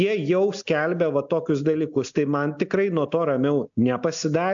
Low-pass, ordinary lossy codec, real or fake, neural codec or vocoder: 7.2 kHz; AAC, 64 kbps; real; none